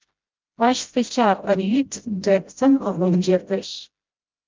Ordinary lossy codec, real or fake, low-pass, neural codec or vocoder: Opus, 24 kbps; fake; 7.2 kHz; codec, 16 kHz, 0.5 kbps, FreqCodec, smaller model